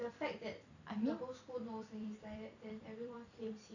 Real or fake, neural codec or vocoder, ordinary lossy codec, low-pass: fake; vocoder, 44.1 kHz, 128 mel bands every 256 samples, BigVGAN v2; none; 7.2 kHz